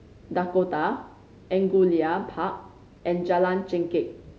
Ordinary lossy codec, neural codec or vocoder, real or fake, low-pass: none; none; real; none